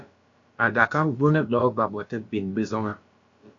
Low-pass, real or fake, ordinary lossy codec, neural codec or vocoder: 7.2 kHz; fake; AAC, 48 kbps; codec, 16 kHz, about 1 kbps, DyCAST, with the encoder's durations